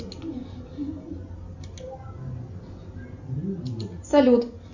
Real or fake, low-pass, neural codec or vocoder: real; 7.2 kHz; none